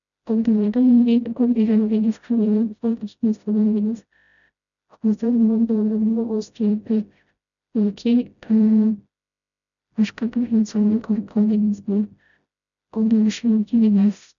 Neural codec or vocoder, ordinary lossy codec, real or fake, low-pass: codec, 16 kHz, 0.5 kbps, FreqCodec, smaller model; none; fake; 7.2 kHz